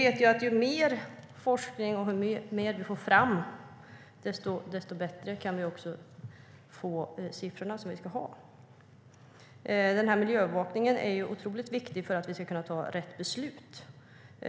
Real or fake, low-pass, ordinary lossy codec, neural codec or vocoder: real; none; none; none